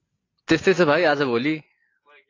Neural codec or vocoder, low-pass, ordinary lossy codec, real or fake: none; 7.2 kHz; AAC, 32 kbps; real